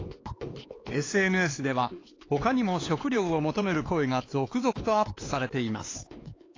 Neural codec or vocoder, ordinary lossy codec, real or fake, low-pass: codec, 16 kHz, 4 kbps, X-Codec, HuBERT features, trained on LibriSpeech; AAC, 32 kbps; fake; 7.2 kHz